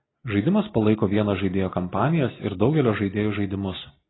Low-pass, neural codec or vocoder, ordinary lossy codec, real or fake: 7.2 kHz; none; AAC, 16 kbps; real